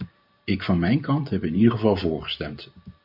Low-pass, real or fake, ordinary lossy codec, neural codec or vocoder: 5.4 kHz; fake; MP3, 48 kbps; vocoder, 44.1 kHz, 128 mel bands every 512 samples, BigVGAN v2